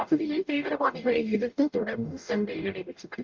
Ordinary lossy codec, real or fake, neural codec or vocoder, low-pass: Opus, 24 kbps; fake; codec, 44.1 kHz, 0.9 kbps, DAC; 7.2 kHz